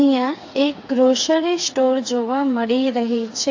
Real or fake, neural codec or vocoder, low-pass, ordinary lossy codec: fake; codec, 16 kHz, 4 kbps, FreqCodec, smaller model; 7.2 kHz; AAC, 48 kbps